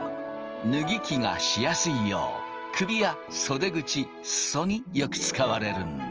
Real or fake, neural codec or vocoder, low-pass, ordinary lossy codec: real; none; 7.2 kHz; Opus, 24 kbps